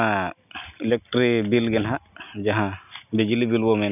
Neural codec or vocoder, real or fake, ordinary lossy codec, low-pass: none; real; none; 3.6 kHz